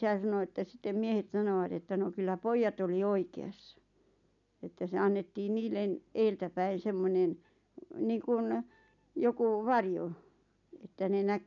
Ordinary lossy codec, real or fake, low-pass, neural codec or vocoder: none; real; 7.2 kHz; none